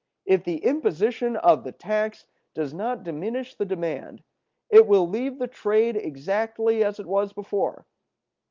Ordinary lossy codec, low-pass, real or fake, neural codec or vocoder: Opus, 32 kbps; 7.2 kHz; real; none